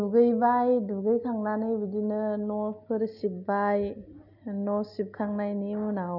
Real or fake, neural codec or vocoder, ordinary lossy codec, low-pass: real; none; none; 5.4 kHz